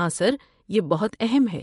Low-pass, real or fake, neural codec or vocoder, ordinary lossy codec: 10.8 kHz; fake; vocoder, 24 kHz, 100 mel bands, Vocos; MP3, 64 kbps